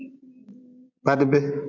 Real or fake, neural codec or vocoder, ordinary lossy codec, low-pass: real; none; MP3, 64 kbps; 7.2 kHz